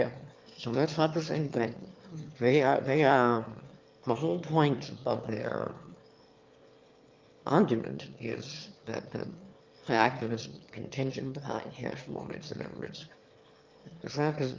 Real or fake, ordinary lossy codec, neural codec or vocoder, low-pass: fake; Opus, 32 kbps; autoencoder, 22.05 kHz, a latent of 192 numbers a frame, VITS, trained on one speaker; 7.2 kHz